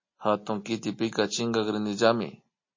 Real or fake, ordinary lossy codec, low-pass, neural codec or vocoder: real; MP3, 32 kbps; 7.2 kHz; none